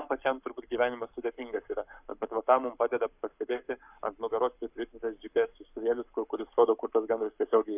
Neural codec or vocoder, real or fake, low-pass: codec, 44.1 kHz, 7.8 kbps, Pupu-Codec; fake; 3.6 kHz